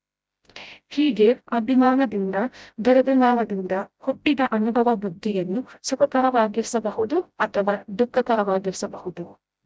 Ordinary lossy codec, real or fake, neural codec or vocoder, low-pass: none; fake; codec, 16 kHz, 0.5 kbps, FreqCodec, smaller model; none